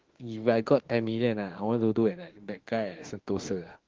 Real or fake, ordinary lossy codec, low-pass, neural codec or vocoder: fake; Opus, 16 kbps; 7.2 kHz; autoencoder, 48 kHz, 32 numbers a frame, DAC-VAE, trained on Japanese speech